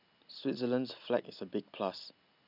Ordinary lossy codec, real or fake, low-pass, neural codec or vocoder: none; real; 5.4 kHz; none